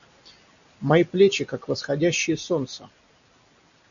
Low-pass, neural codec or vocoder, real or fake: 7.2 kHz; none; real